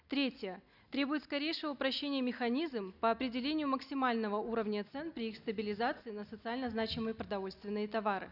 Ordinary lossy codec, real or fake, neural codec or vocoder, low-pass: none; real; none; 5.4 kHz